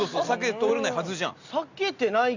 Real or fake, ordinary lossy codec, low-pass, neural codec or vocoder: real; Opus, 64 kbps; 7.2 kHz; none